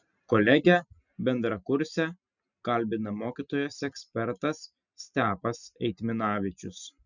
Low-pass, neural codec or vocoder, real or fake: 7.2 kHz; none; real